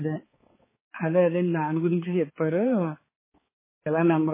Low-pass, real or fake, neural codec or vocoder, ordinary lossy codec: 3.6 kHz; fake; codec, 16 kHz, 4 kbps, X-Codec, HuBERT features, trained on balanced general audio; MP3, 16 kbps